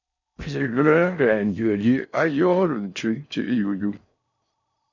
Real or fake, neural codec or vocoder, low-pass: fake; codec, 16 kHz in and 24 kHz out, 0.6 kbps, FocalCodec, streaming, 4096 codes; 7.2 kHz